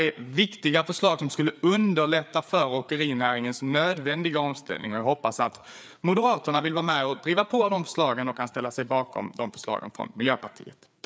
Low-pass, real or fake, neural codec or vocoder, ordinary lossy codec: none; fake; codec, 16 kHz, 4 kbps, FreqCodec, larger model; none